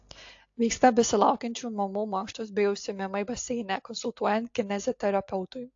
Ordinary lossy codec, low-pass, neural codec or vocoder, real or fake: AAC, 48 kbps; 7.2 kHz; none; real